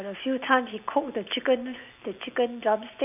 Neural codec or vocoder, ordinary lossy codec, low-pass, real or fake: none; none; 3.6 kHz; real